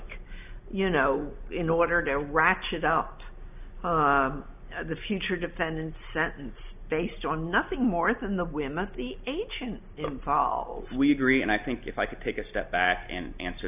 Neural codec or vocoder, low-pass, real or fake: none; 3.6 kHz; real